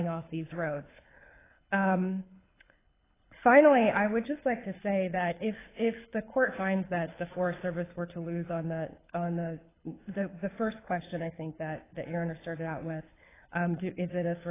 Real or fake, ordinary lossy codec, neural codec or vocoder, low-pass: fake; AAC, 16 kbps; codec, 24 kHz, 6 kbps, HILCodec; 3.6 kHz